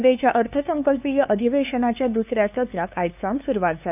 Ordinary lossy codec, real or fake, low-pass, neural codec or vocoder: none; fake; 3.6 kHz; codec, 16 kHz, 2 kbps, FunCodec, trained on LibriTTS, 25 frames a second